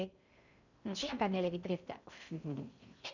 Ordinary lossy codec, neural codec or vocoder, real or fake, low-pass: none; codec, 16 kHz in and 24 kHz out, 0.6 kbps, FocalCodec, streaming, 2048 codes; fake; 7.2 kHz